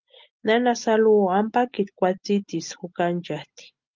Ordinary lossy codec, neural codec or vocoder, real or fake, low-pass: Opus, 32 kbps; none; real; 7.2 kHz